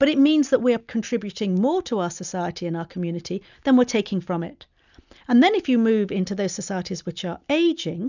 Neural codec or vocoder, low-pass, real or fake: none; 7.2 kHz; real